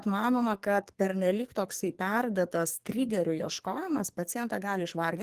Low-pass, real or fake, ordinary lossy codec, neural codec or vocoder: 14.4 kHz; fake; Opus, 24 kbps; codec, 44.1 kHz, 2.6 kbps, SNAC